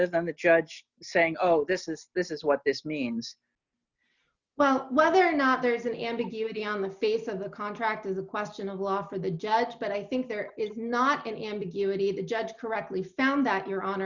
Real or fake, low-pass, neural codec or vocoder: real; 7.2 kHz; none